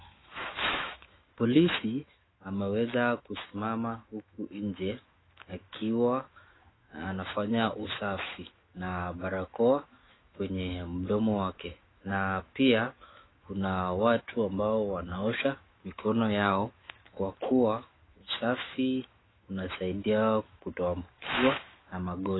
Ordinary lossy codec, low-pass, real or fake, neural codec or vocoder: AAC, 16 kbps; 7.2 kHz; fake; autoencoder, 48 kHz, 128 numbers a frame, DAC-VAE, trained on Japanese speech